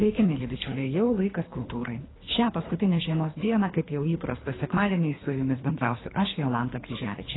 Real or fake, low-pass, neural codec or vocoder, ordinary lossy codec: fake; 7.2 kHz; codec, 24 kHz, 3 kbps, HILCodec; AAC, 16 kbps